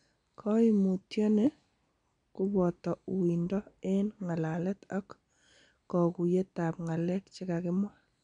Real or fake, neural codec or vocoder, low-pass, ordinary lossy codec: real; none; 9.9 kHz; none